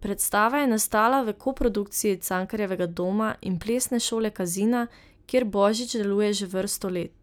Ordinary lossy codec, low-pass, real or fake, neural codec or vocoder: none; none; real; none